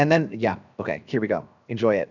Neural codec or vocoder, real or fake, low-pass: codec, 16 kHz, 0.7 kbps, FocalCodec; fake; 7.2 kHz